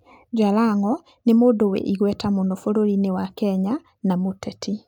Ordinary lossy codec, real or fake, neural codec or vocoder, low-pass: none; real; none; 19.8 kHz